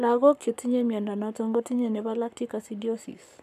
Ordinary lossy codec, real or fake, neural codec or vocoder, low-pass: none; fake; codec, 44.1 kHz, 7.8 kbps, Pupu-Codec; 14.4 kHz